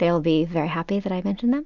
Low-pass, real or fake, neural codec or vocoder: 7.2 kHz; real; none